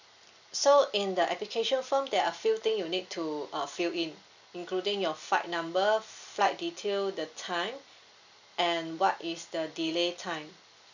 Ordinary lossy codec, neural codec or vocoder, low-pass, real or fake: none; none; 7.2 kHz; real